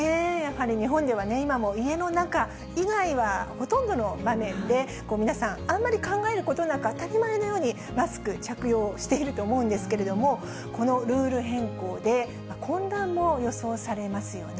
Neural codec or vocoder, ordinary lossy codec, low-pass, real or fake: none; none; none; real